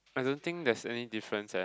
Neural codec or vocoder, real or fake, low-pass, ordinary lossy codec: none; real; none; none